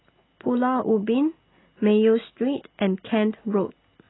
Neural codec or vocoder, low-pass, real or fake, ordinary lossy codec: none; 7.2 kHz; real; AAC, 16 kbps